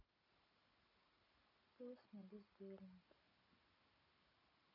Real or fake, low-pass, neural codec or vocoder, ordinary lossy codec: real; 5.4 kHz; none; none